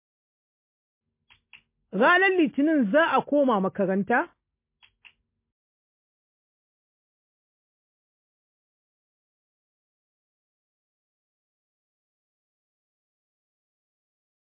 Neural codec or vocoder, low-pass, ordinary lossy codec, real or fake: none; 3.6 kHz; MP3, 24 kbps; real